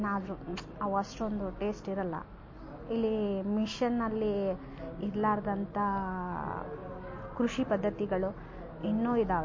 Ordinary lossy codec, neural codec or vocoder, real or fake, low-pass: MP3, 32 kbps; none; real; 7.2 kHz